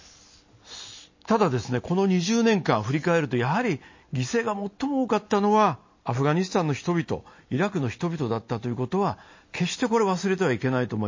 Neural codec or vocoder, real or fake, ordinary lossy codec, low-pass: none; real; MP3, 32 kbps; 7.2 kHz